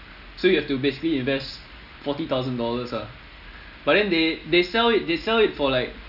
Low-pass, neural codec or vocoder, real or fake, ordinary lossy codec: 5.4 kHz; none; real; none